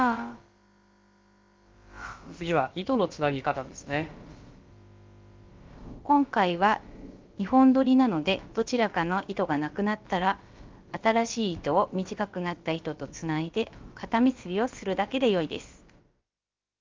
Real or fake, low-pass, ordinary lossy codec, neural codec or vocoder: fake; 7.2 kHz; Opus, 32 kbps; codec, 16 kHz, about 1 kbps, DyCAST, with the encoder's durations